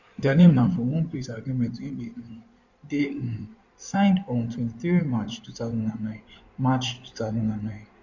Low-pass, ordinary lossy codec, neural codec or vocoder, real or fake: 7.2 kHz; none; codec, 16 kHz in and 24 kHz out, 2.2 kbps, FireRedTTS-2 codec; fake